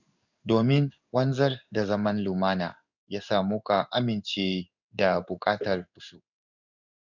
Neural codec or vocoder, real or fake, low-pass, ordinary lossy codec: codec, 16 kHz in and 24 kHz out, 1 kbps, XY-Tokenizer; fake; 7.2 kHz; none